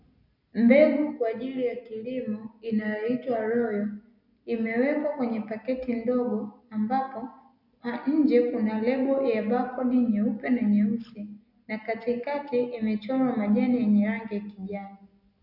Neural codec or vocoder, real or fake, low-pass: none; real; 5.4 kHz